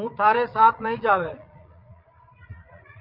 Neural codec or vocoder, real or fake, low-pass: vocoder, 22.05 kHz, 80 mel bands, Vocos; fake; 5.4 kHz